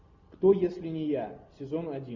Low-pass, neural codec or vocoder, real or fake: 7.2 kHz; none; real